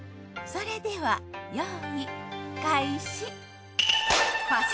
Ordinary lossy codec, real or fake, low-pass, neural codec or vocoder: none; real; none; none